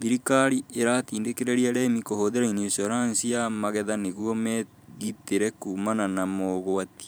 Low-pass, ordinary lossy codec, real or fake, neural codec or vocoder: none; none; fake; vocoder, 44.1 kHz, 128 mel bands every 512 samples, BigVGAN v2